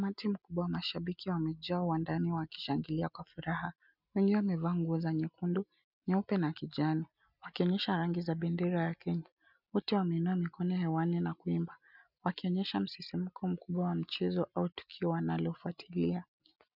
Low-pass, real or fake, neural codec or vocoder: 5.4 kHz; real; none